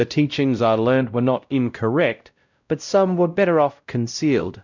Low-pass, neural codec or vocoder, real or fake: 7.2 kHz; codec, 16 kHz, 0.5 kbps, X-Codec, WavLM features, trained on Multilingual LibriSpeech; fake